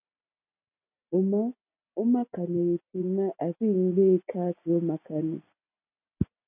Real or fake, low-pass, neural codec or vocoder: real; 3.6 kHz; none